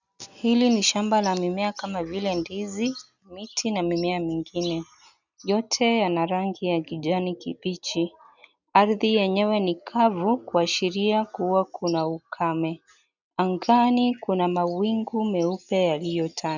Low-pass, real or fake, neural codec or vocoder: 7.2 kHz; real; none